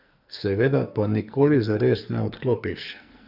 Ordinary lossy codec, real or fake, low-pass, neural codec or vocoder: none; fake; 5.4 kHz; codec, 44.1 kHz, 2.6 kbps, SNAC